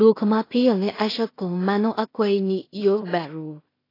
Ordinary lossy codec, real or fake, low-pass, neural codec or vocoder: AAC, 24 kbps; fake; 5.4 kHz; codec, 16 kHz in and 24 kHz out, 0.4 kbps, LongCat-Audio-Codec, two codebook decoder